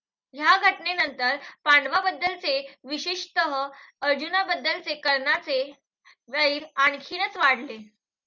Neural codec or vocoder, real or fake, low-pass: none; real; 7.2 kHz